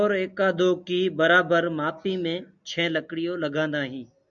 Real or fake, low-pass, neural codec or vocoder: real; 7.2 kHz; none